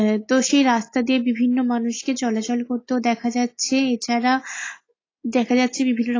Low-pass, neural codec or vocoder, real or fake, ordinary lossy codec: 7.2 kHz; none; real; AAC, 32 kbps